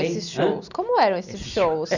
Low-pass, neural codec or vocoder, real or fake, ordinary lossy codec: 7.2 kHz; none; real; none